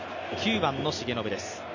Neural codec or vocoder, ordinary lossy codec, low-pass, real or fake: none; none; 7.2 kHz; real